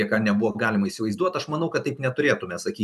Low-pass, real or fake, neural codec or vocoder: 14.4 kHz; fake; vocoder, 44.1 kHz, 128 mel bands every 512 samples, BigVGAN v2